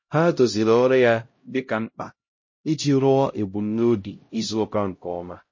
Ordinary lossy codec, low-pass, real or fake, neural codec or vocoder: MP3, 32 kbps; 7.2 kHz; fake; codec, 16 kHz, 0.5 kbps, X-Codec, HuBERT features, trained on LibriSpeech